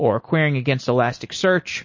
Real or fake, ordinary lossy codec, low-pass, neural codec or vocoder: real; MP3, 32 kbps; 7.2 kHz; none